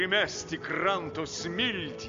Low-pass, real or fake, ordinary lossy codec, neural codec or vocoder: 7.2 kHz; real; MP3, 48 kbps; none